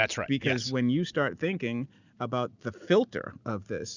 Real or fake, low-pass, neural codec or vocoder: real; 7.2 kHz; none